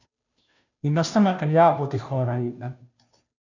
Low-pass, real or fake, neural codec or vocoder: 7.2 kHz; fake; codec, 16 kHz, 0.5 kbps, FunCodec, trained on Chinese and English, 25 frames a second